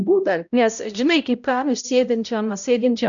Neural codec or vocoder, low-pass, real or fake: codec, 16 kHz, 0.5 kbps, X-Codec, HuBERT features, trained on balanced general audio; 7.2 kHz; fake